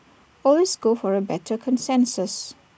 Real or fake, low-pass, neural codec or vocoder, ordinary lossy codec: real; none; none; none